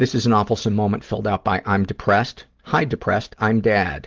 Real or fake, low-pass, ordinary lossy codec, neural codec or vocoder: real; 7.2 kHz; Opus, 16 kbps; none